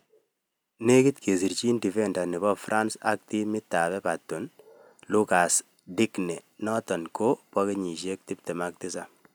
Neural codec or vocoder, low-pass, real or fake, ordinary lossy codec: none; none; real; none